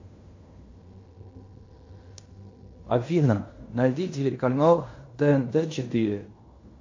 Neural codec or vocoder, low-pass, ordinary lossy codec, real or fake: codec, 16 kHz in and 24 kHz out, 0.9 kbps, LongCat-Audio-Codec, fine tuned four codebook decoder; 7.2 kHz; MP3, 48 kbps; fake